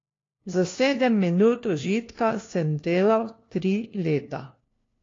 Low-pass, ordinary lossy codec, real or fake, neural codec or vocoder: 7.2 kHz; AAC, 32 kbps; fake; codec, 16 kHz, 1 kbps, FunCodec, trained on LibriTTS, 50 frames a second